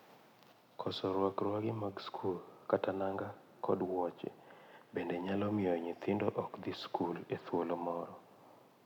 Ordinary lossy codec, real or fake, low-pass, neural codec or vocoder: none; real; 19.8 kHz; none